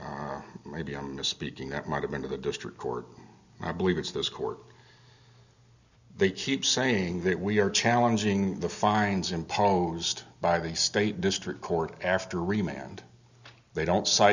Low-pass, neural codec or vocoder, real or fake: 7.2 kHz; none; real